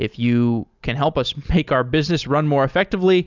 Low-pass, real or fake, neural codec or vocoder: 7.2 kHz; real; none